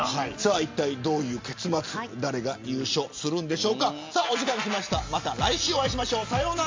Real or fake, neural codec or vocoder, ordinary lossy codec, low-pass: real; none; MP3, 48 kbps; 7.2 kHz